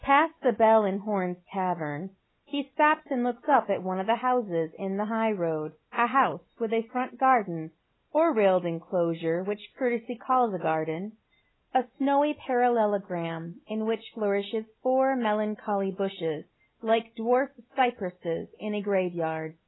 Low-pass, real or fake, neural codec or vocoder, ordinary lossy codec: 7.2 kHz; real; none; AAC, 16 kbps